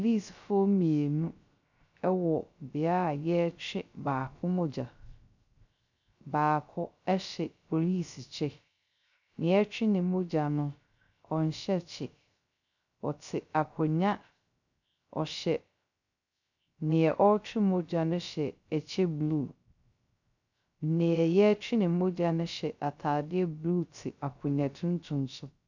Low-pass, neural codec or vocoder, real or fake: 7.2 kHz; codec, 16 kHz, 0.3 kbps, FocalCodec; fake